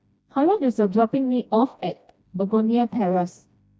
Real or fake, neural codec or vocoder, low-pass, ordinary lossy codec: fake; codec, 16 kHz, 1 kbps, FreqCodec, smaller model; none; none